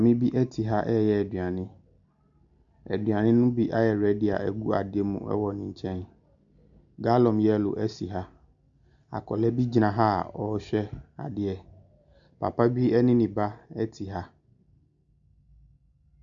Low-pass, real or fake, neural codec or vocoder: 7.2 kHz; real; none